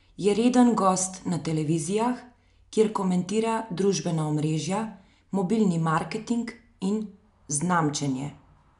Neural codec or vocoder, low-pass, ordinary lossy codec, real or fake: none; 10.8 kHz; none; real